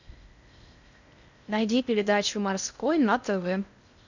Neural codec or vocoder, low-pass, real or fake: codec, 16 kHz in and 24 kHz out, 0.6 kbps, FocalCodec, streaming, 2048 codes; 7.2 kHz; fake